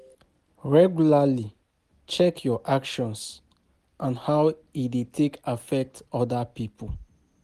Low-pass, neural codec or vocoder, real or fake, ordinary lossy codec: 14.4 kHz; none; real; Opus, 24 kbps